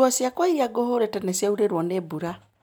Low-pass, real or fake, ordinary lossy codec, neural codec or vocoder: none; real; none; none